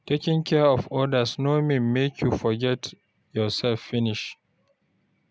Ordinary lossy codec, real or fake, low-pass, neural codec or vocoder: none; real; none; none